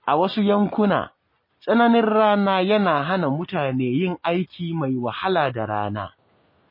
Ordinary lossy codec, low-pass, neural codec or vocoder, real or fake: MP3, 24 kbps; 5.4 kHz; none; real